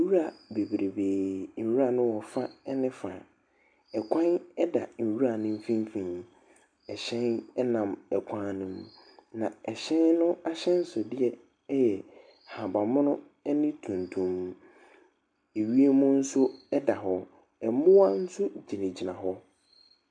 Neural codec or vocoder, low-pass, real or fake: none; 9.9 kHz; real